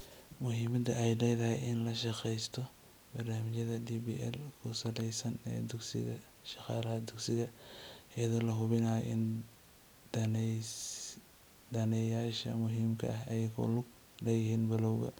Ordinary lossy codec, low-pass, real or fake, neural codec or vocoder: none; none; real; none